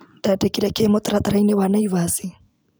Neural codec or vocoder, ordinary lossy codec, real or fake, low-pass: none; none; real; none